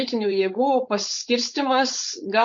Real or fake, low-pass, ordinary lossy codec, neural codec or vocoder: fake; 7.2 kHz; MP3, 48 kbps; codec, 16 kHz, 4.8 kbps, FACodec